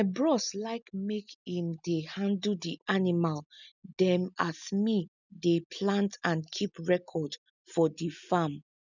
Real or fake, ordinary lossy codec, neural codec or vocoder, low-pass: real; none; none; 7.2 kHz